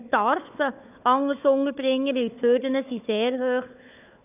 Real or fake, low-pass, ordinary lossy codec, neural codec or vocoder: fake; 3.6 kHz; none; codec, 16 kHz, 4 kbps, FunCodec, trained on Chinese and English, 50 frames a second